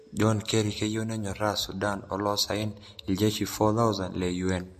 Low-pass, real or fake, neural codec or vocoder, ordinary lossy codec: 14.4 kHz; real; none; MP3, 64 kbps